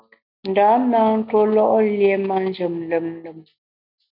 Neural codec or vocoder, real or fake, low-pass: none; real; 5.4 kHz